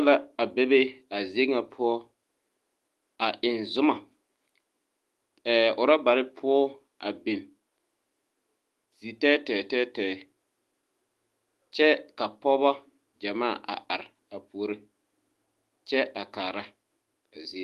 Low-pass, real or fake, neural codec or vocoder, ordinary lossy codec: 14.4 kHz; fake; autoencoder, 48 kHz, 128 numbers a frame, DAC-VAE, trained on Japanese speech; Opus, 24 kbps